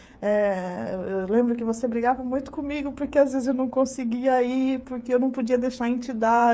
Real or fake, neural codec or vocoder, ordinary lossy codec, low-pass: fake; codec, 16 kHz, 8 kbps, FreqCodec, smaller model; none; none